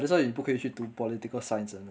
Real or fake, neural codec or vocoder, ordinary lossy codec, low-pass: real; none; none; none